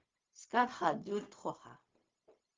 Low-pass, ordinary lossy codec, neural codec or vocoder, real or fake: 7.2 kHz; Opus, 32 kbps; codec, 16 kHz, 0.4 kbps, LongCat-Audio-Codec; fake